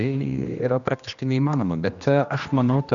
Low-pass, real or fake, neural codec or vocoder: 7.2 kHz; fake; codec, 16 kHz, 1 kbps, X-Codec, HuBERT features, trained on general audio